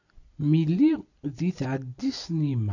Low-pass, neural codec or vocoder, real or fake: 7.2 kHz; none; real